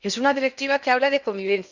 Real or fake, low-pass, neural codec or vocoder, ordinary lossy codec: fake; 7.2 kHz; codec, 16 kHz in and 24 kHz out, 0.8 kbps, FocalCodec, streaming, 65536 codes; Opus, 64 kbps